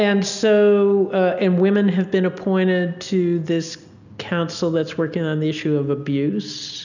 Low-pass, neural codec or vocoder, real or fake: 7.2 kHz; none; real